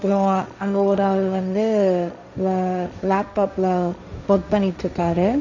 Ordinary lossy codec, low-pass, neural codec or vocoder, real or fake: none; 7.2 kHz; codec, 16 kHz, 1.1 kbps, Voila-Tokenizer; fake